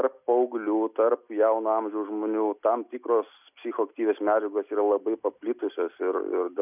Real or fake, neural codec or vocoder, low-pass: real; none; 3.6 kHz